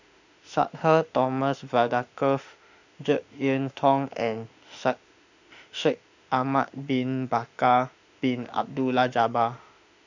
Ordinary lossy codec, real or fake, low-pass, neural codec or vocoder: none; fake; 7.2 kHz; autoencoder, 48 kHz, 32 numbers a frame, DAC-VAE, trained on Japanese speech